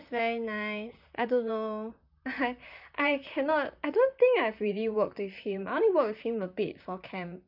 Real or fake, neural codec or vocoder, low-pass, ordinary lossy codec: fake; vocoder, 44.1 kHz, 128 mel bands, Pupu-Vocoder; 5.4 kHz; none